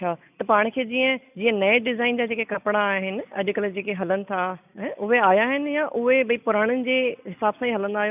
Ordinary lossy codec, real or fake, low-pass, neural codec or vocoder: none; real; 3.6 kHz; none